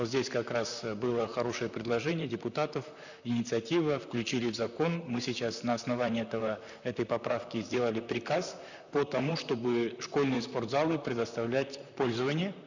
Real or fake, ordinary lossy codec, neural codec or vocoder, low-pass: fake; none; vocoder, 44.1 kHz, 128 mel bands, Pupu-Vocoder; 7.2 kHz